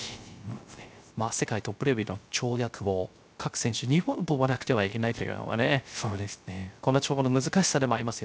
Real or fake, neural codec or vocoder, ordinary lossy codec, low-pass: fake; codec, 16 kHz, 0.3 kbps, FocalCodec; none; none